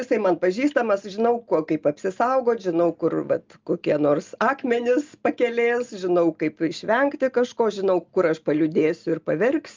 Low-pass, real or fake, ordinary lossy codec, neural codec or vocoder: 7.2 kHz; real; Opus, 24 kbps; none